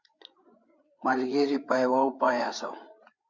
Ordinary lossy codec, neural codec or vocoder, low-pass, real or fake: Opus, 64 kbps; codec, 16 kHz, 8 kbps, FreqCodec, larger model; 7.2 kHz; fake